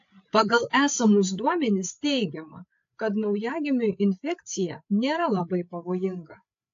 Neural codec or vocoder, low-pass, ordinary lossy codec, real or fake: codec, 16 kHz, 8 kbps, FreqCodec, larger model; 7.2 kHz; AAC, 48 kbps; fake